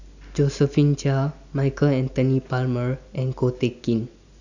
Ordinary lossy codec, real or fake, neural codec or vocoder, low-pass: none; real; none; 7.2 kHz